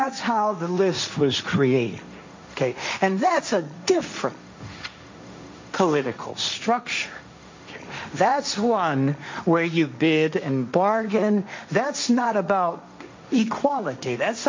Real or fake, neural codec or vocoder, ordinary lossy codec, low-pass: fake; codec, 16 kHz, 1.1 kbps, Voila-Tokenizer; MP3, 48 kbps; 7.2 kHz